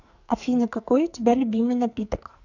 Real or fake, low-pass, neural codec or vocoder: fake; 7.2 kHz; codec, 44.1 kHz, 2.6 kbps, SNAC